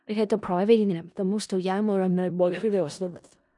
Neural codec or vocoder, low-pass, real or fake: codec, 16 kHz in and 24 kHz out, 0.4 kbps, LongCat-Audio-Codec, four codebook decoder; 10.8 kHz; fake